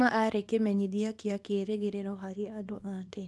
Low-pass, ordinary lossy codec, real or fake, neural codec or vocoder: none; none; fake; codec, 24 kHz, 0.9 kbps, WavTokenizer, small release